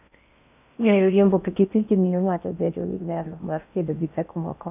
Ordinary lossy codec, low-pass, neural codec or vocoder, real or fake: none; 3.6 kHz; codec, 16 kHz in and 24 kHz out, 0.6 kbps, FocalCodec, streaming, 4096 codes; fake